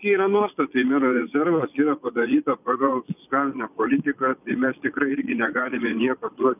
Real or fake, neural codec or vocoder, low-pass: fake; vocoder, 22.05 kHz, 80 mel bands, Vocos; 3.6 kHz